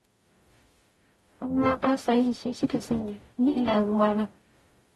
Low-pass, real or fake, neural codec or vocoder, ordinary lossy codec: 19.8 kHz; fake; codec, 44.1 kHz, 0.9 kbps, DAC; AAC, 32 kbps